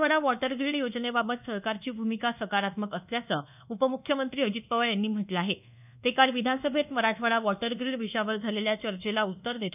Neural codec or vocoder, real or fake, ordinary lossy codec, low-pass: codec, 24 kHz, 1.2 kbps, DualCodec; fake; none; 3.6 kHz